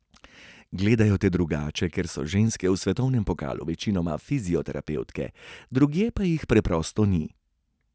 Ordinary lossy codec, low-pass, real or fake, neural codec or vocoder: none; none; real; none